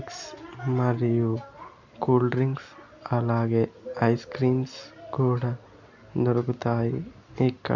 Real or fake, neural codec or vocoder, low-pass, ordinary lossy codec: real; none; 7.2 kHz; none